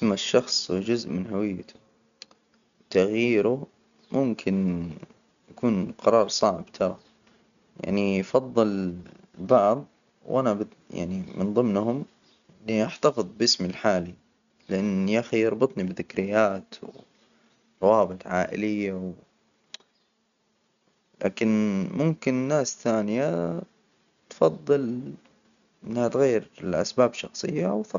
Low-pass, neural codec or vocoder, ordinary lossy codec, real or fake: 7.2 kHz; none; none; real